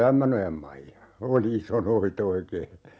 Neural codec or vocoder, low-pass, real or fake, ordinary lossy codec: none; none; real; none